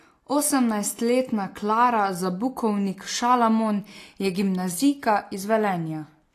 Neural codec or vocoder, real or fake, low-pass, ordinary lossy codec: none; real; 14.4 kHz; AAC, 48 kbps